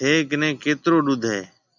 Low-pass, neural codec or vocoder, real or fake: 7.2 kHz; none; real